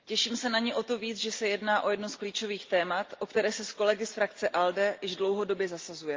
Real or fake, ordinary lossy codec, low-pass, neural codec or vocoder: real; Opus, 32 kbps; 7.2 kHz; none